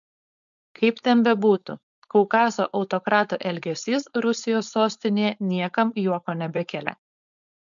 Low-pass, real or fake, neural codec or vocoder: 7.2 kHz; fake; codec, 16 kHz, 4.8 kbps, FACodec